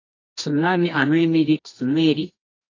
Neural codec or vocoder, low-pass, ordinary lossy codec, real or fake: codec, 24 kHz, 0.9 kbps, WavTokenizer, medium music audio release; 7.2 kHz; AAC, 32 kbps; fake